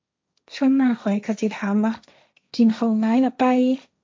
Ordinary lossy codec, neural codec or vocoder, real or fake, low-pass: none; codec, 16 kHz, 1.1 kbps, Voila-Tokenizer; fake; none